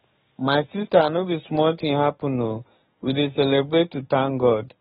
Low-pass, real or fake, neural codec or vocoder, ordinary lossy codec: 19.8 kHz; fake; codec, 44.1 kHz, 7.8 kbps, DAC; AAC, 16 kbps